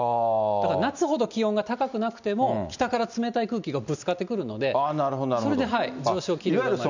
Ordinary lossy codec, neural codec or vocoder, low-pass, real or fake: none; none; 7.2 kHz; real